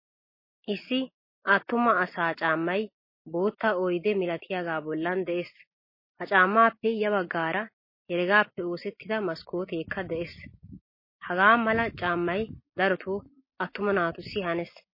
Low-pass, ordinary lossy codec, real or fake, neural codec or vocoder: 5.4 kHz; MP3, 24 kbps; real; none